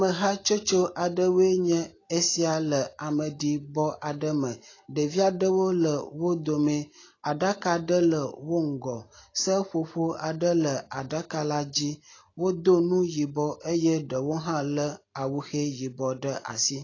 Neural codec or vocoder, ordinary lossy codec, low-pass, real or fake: none; AAC, 32 kbps; 7.2 kHz; real